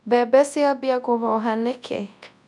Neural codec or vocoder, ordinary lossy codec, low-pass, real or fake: codec, 24 kHz, 0.9 kbps, WavTokenizer, large speech release; none; 10.8 kHz; fake